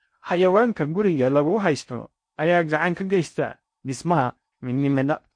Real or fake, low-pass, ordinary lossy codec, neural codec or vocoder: fake; 9.9 kHz; MP3, 48 kbps; codec, 16 kHz in and 24 kHz out, 0.6 kbps, FocalCodec, streaming, 4096 codes